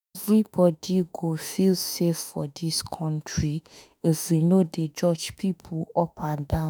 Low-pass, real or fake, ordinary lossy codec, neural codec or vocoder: none; fake; none; autoencoder, 48 kHz, 32 numbers a frame, DAC-VAE, trained on Japanese speech